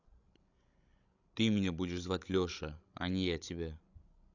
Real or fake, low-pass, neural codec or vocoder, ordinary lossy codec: fake; 7.2 kHz; codec, 16 kHz, 16 kbps, FreqCodec, larger model; none